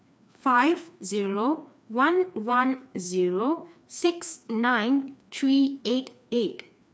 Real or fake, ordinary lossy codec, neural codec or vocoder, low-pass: fake; none; codec, 16 kHz, 2 kbps, FreqCodec, larger model; none